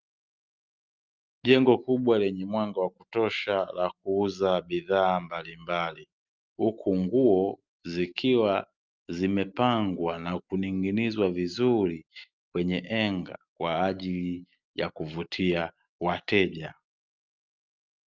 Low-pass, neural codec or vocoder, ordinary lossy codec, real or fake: 7.2 kHz; none; Opus, 24 kbps; real